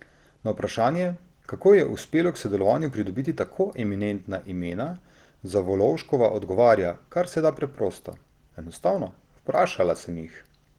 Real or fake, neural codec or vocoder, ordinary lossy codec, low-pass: real; none; Opus, 16 kbps; 19.8 kHz